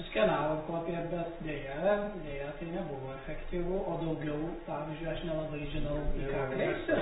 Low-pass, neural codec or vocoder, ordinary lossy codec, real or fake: 19.8 kHz; none; AAC, 16 kbps; real